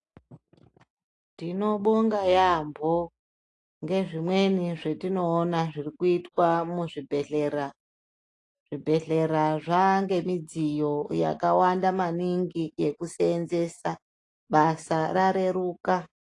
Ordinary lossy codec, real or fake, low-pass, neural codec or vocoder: AAC, 48 kbps; real; 10.8 kHz; none